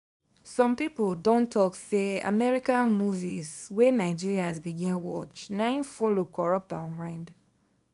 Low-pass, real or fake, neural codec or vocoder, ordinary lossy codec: 10.8 kHz; fake; codec, 24 kHz, 0.9 kbps, WavTokenizer, small release; none